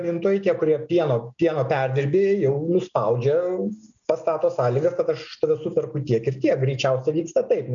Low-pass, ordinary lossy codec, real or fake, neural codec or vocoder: 7.2 kHz; MP3, 96 kbps; real; none